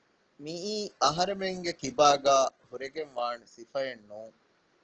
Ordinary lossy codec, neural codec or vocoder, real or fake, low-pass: Opus, 16 kbps; none; real; 7.2 kHz